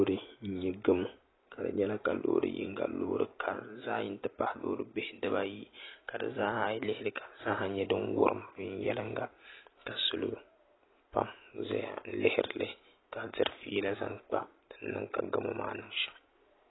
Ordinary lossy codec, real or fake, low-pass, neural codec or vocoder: AAC, 16 kbps; real; 7.2 kHz; none